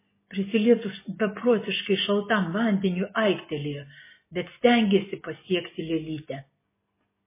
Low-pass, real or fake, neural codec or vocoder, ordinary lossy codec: 3.6 kHz; real; none; MP3, 16 kbps